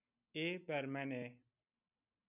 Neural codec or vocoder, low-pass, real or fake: none; 3.6 kHz; real